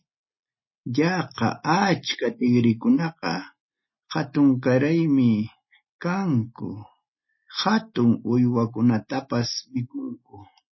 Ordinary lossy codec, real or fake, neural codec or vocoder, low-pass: MP3, 24 kbps; real; none; 7.2 kHz